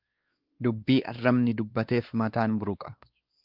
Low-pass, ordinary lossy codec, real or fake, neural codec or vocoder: 5.4 kHz; Opus, 32 kbps; fake; codec, 16 kHz, 2 kbps, X-Codec, WavLM features, trained on Multilingual LibriSpeech